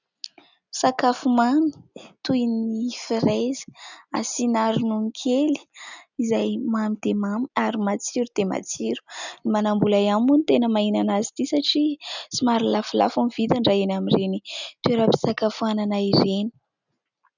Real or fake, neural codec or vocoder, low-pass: real; none; 7.2 kHz